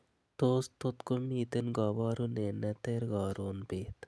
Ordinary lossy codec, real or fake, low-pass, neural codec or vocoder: none; real; none; none